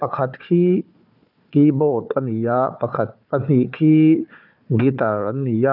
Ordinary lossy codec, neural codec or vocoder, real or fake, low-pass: none; codec, 16 kHz, 4 kbps, FunCodec, trained on Chinese and English, 50 frames a second; fake; 5.4 kHz